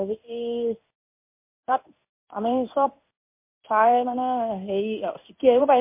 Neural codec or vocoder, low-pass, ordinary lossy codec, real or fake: codec, 16 kHz in and 24 kHz out, 1 kbps, XY-Tokenizer; 3.6 kHz; MP3, 24 kbps; fake